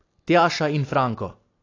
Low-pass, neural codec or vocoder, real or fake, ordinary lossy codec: 7.2 kHz; none; real; AAC, 32 kbps